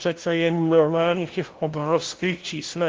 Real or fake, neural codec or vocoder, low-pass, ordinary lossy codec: fake; codec, 16 kHz, 0.5 kbps, FunCodec, trained on LibriTTS, 25 frames a second; 7.2 kHz; Opus, 16 kbps